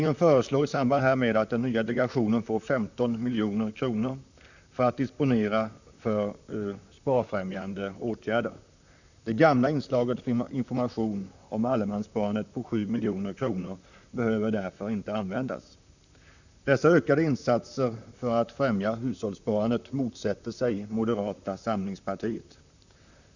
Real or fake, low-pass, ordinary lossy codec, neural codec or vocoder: fake; 7.2 kHz; none; vocoder, 44.1 kHz, 128 mel bands, Pupu-Vocoder